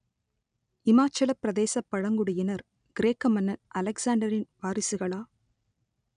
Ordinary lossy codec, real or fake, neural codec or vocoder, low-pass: none; real; none; 9.9 kHz